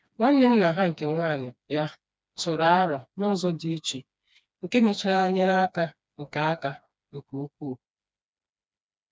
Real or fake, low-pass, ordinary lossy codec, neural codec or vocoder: fake; none; none; codec, 16 kHz, 2 kbps, FreqCodec, smaller model